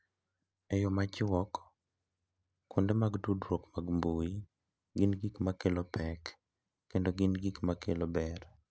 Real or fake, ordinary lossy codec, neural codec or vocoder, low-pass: real; none; none; none